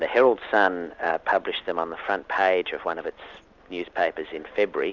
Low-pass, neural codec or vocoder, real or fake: 7.2 kHz; none; real